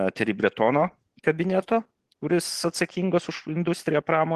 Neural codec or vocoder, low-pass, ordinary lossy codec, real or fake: none; 14.4 kHz; Opus, 16 kbps; real